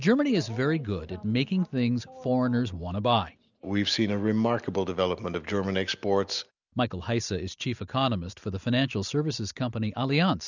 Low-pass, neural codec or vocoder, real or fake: 7.2 kHz; none; real